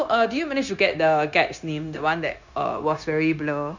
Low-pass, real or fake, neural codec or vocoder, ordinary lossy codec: 7.2 kHz; fake; codec, 16 kHz, 0.9 kbps, LongCat-Audio-Codec; Opus, 64 kbps